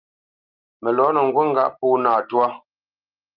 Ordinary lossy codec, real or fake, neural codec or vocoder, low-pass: Opus, 32 kbps; real; none; 5.4 kHz